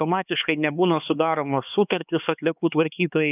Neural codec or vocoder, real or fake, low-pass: codec, 16 kHz, 2 kbps, X-Codec, HuBERT features, trained on LibriSpeech; fake; 3.6 kHz